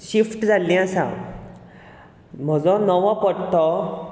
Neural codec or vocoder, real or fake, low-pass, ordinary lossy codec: none; real; none; none